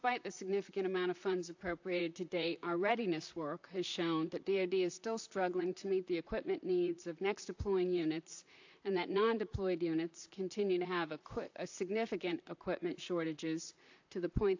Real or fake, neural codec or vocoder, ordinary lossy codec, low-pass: fake; vocoder, 44.1 kHz, 128 mel bands, Pupu-Vocoder; AAC, 48 kbps; 7.2 kHz